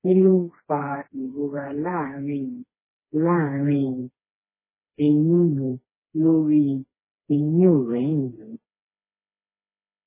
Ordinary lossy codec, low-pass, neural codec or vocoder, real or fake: MP3, 16 kbps; 3.6 kHz; codec, 16 kHz, 2 kbps, FreqCodec, smaller model; fake